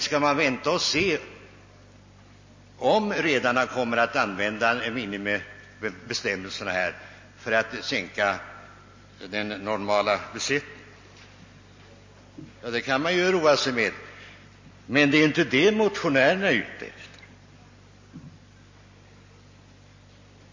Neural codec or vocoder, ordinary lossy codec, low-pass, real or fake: none; MP3, 32 kbps; 7.2 kHz; real